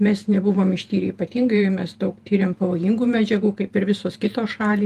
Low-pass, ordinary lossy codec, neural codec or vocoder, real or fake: 14.4 kHz; Opus, 32 kbps; vocoder, 48 kHz, 128 mel bands, Vocos; fake